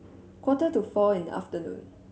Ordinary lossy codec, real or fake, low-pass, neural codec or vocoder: none; real; none; none